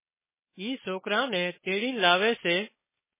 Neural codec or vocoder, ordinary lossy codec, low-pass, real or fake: codec, 16 kHz, 4.8 kbps, FACodec; MP3, 16 kbps; 3.6 kHz; fake